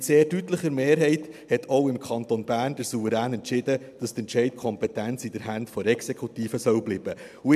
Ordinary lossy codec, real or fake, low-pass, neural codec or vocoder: MP3, 96 kbps; real; 14.4 kHz; none